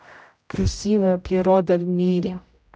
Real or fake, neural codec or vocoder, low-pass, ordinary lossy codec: fake; codec, 16 kHz, 0.5 kbps, X-Codec, HuBERT features, trained on general audio; none; none